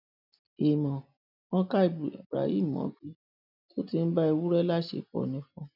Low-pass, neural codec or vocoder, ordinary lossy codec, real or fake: 5.4 kHz; none; none; real